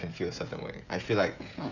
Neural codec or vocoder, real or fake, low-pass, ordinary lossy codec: codec, 16 kHz, 16 kbps, FreqCodec, smaller model; fake; 7.2 kHz; none